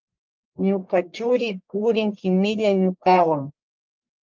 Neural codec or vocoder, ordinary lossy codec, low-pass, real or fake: codec, 44.1 kHz, 1.7 kbps, Pupu-Codec; Opus, 24 kbps; 7.2 kHz; fake